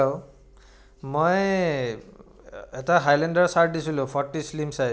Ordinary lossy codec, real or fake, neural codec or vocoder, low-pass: none; real; none; none